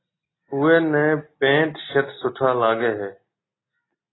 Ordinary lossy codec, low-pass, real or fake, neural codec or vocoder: AAC, 16 kbps; 7.2 kHz; real; none